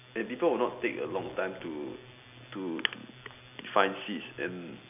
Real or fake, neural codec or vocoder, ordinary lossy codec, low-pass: real; none; none; 3.6 kHz